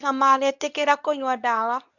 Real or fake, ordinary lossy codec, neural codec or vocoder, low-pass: fake; none; codec, 24 kHz, 0.9 kbps, WavTokenizer, medium speech release version 2; 7.2 kHz